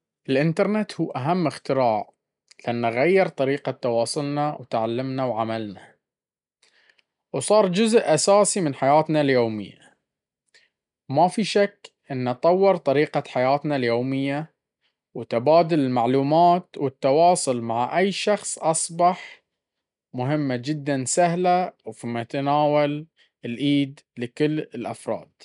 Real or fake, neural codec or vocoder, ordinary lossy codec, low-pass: real; none; none; 10.8 kHz